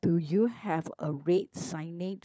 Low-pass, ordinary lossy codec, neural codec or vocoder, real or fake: none; none; codec, 16 kHz, 16 kbps, FunCodec, trained on LibriTTS, 50 frames a second; fake